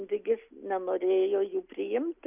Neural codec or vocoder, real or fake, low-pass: none; real; 3.6 kHz